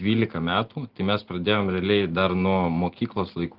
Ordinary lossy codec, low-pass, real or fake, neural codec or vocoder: Opus, 16 kbps; 5.4 kHz; real; none